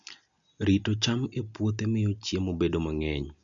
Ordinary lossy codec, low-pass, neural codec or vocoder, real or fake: none; 7.2 kHz; none; real